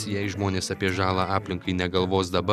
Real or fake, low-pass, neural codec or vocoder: real; 14.4 kHz; none